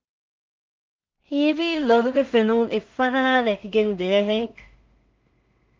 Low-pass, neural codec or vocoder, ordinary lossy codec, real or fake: 7.2 kHz; codec, 16 kHz in and 24 kHz out, 0.4 kbps, LongCat-Audio-Codec, two codebook decoder; Opus, 32 kbps; fake